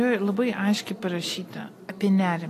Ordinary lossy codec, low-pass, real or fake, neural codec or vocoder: AAC, 48 kbps; 14.4 kHz; real; none